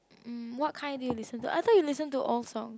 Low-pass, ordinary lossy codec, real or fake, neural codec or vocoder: none; none; real; none